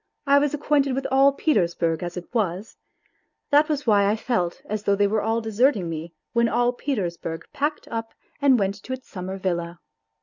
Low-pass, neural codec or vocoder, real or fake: 7.2 kHz; none; real